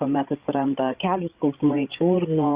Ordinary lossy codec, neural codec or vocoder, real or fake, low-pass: Opus, 64 kbps; codec, 16 kHz, 8 kbps, FreqCodec, larger model; fake; 3.6 kHz